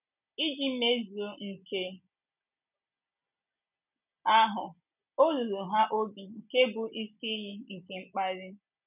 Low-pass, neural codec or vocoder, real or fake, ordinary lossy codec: 3.6 kHz; none; real; none